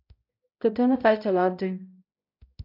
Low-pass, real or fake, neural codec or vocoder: 5.4 kHz; fake; codec, 16 kHz, 0.5 kbps, X-Codec, HuBERT features, trained on balanced general audio